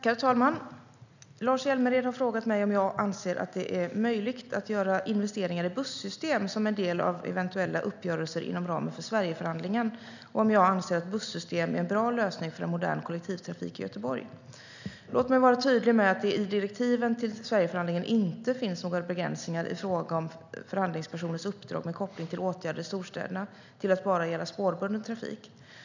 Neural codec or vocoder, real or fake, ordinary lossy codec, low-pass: none; real; none; 7.2 kHz